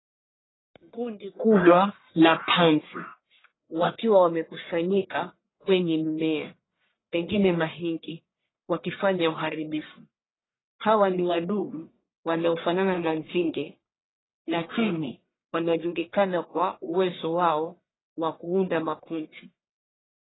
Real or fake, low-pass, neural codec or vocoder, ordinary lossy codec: fake; 7.2 kHz; codec, 44.1 kHz, 1.7 kbps, Pupu-Codec; AAC, 16 kbps